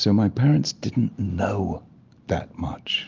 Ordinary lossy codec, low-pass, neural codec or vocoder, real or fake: Opus, 24 kbps; 7.2 kHz; none; real